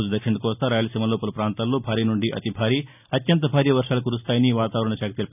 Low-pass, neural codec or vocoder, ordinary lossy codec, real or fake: 3.6 kHz; none; none; real